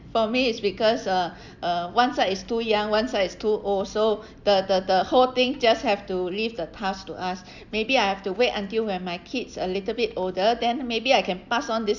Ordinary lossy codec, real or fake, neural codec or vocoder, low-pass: none; real; none; 7.2 kHz